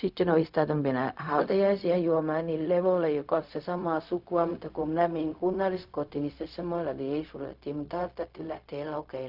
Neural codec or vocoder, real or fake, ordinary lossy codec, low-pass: codec, 16 kHz, 0.4 kbps, LongCat-Audio-Codec; fake; none; 5.4 kHz